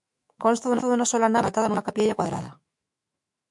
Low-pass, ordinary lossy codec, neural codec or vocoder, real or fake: 10.8 kHz; MP3, 64 kbps; autoencoder, 48 kHz, 128 numbers a frame, DAC-VAE, trained on Japanese speech; fake